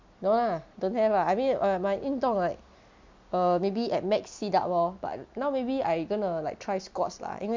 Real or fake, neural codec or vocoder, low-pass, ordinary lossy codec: real; none; 7.2 kHz; MP3, 64 kbps